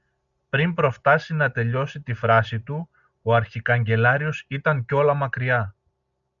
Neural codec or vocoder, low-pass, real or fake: none; 7.2 kHz; real